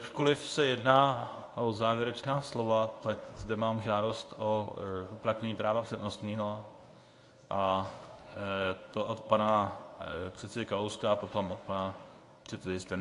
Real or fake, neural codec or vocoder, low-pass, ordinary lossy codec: fake; codec, 24 kHz, 0.9 kbps, WavTokenizer, medium speech release version 1; 10.8 kHz; AAC, 48 kbps